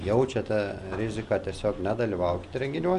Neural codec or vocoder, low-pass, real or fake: none; 10.8 kHz; real